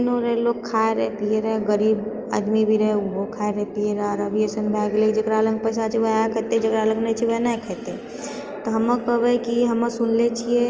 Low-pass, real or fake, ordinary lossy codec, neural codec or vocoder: 7.2 kHz; real; Opus, 24 kbps; none